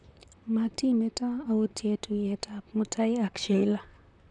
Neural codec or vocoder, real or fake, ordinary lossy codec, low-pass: vocoder, 44.1 kHz, 128 mel bands, Pupu-Vocoder; fake; none; 10.8 kHz